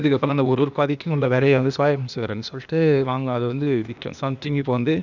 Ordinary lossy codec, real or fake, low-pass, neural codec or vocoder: none; fake; 7.2 kHz; codec, 16 kHz, 0.8 kbps, ZipCodec